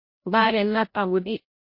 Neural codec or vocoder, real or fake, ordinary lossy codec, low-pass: codec, 16 kHz, 0.5 kbps, FreqCodec, larger model; fake; MP3, 32 kbps; 5.4 kHz